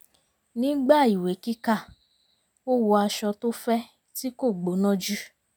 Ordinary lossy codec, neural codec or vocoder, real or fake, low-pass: none; none; real; none